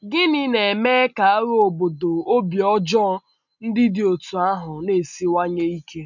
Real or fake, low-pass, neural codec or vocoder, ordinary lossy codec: real; 7.2 kHz; none; none